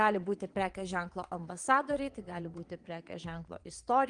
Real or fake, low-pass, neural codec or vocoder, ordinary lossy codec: fake; 9.9 kHz; vocoder, 22.05 kHz, 80 mel bands, Vocos; Opus, 24 kbps